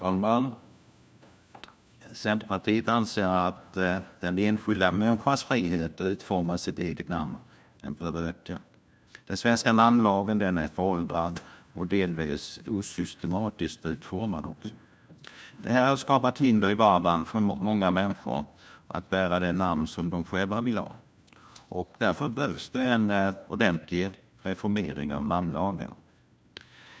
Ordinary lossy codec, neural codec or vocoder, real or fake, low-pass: none; codec, 16 kHz, 1 kbps, FunCodec, trained on LibriTTS, 50 frames a second; fake; none